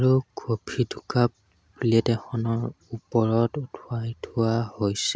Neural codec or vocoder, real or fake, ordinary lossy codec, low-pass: none; real; none; none